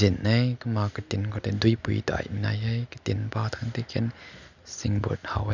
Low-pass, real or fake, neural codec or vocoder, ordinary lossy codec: 7.2 kHz; real; none; none